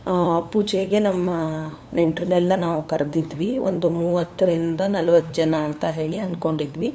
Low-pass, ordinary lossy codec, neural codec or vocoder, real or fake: none; none; codec, 16 kHz, 2 kbps, FunCodec, trained on LibriTTS, 25 frames a second; fake